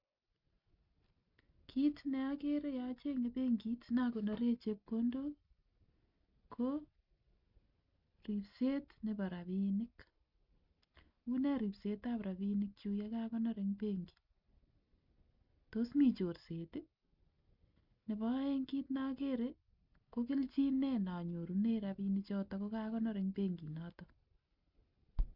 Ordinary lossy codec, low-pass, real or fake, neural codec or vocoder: none; 5.4 kHz; real; none